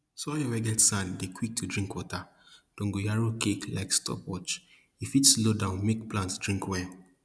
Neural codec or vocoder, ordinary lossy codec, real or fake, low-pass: none; none; real; none